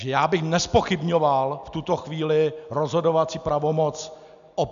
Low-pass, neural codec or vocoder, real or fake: 7.2 kHz; none; real